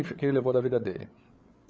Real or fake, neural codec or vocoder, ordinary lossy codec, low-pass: fake; codec, 16 kHz, 8 kbps, FreqCodec, larger model; none; none